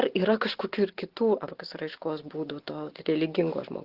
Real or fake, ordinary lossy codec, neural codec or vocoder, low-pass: real; Opus, 16 kbps; none; 5.4 kHz